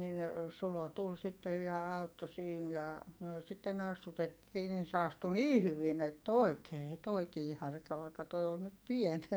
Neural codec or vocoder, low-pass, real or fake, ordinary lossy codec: codec, 44.1 kHz, 2.6 kbps, SNAC; none; fake; none